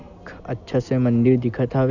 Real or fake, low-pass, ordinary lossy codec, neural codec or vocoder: real; 7.2 kHz; none; none